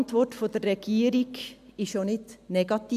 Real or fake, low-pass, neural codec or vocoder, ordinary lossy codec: real; 14.4 kHz; none; AAC, 96 kbps